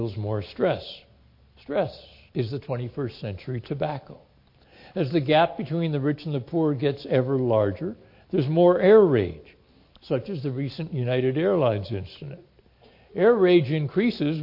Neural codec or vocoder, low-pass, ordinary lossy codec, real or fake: none; 5.4 kHz; MP3, 32 kbps; real